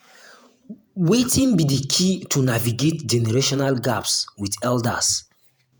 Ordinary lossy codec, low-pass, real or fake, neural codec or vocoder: none; none; fake; vocoder, 48 kHz, 128 mel bands, Vocos